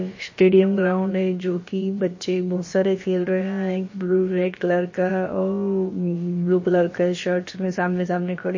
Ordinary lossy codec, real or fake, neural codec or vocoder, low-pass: MP3, 32 kbps; fake; codec, 16 kHz, about 1 kbps, DyCAST, with the encoder's durations; 7.2 kHz